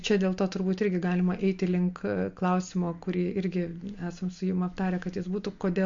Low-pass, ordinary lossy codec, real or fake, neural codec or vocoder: 7.2 kHz; MP3, 48 kbps; real; none